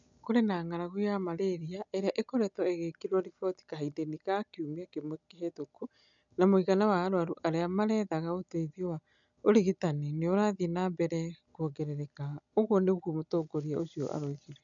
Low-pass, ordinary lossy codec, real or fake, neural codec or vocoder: 7.2 kHz; none; real; none